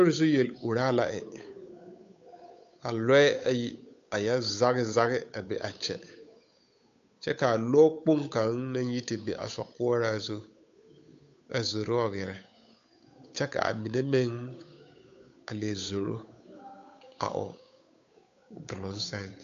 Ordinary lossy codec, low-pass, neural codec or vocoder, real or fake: AAC, 64 kbps; 7.2 kHz; codec, 16 kHz, 8 kbps, FunCodec, trained on Chinese and English, 25 frames a second; fake